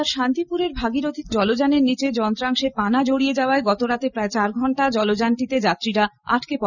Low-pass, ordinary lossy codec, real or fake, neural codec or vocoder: 7.2 kHz; none; real; none